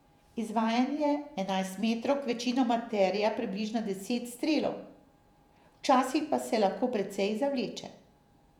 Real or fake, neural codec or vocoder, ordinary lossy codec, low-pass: fake; vocoder, 48 kHz, 128 mel bands, Vocos; none; 19.8 kHz